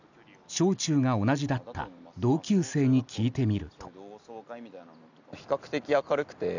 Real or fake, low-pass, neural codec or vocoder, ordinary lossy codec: real; 7.2 kHz; none; none